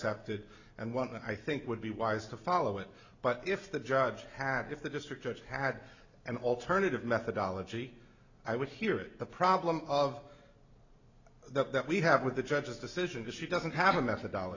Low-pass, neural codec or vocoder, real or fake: 7.2 kHz; none; real